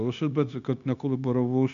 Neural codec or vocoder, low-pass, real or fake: codec, 16 kHz, 0.9 kbps, LongCat-Audio-Codec; 7.2 kHz; fake